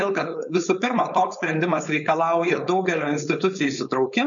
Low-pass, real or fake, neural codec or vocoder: 7.2 kHz; fake; codec, 16 kHz, 4.8 kbps, FACodec